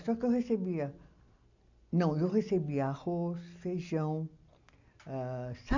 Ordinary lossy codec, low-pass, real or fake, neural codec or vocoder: none; 7.2 kHz; real; none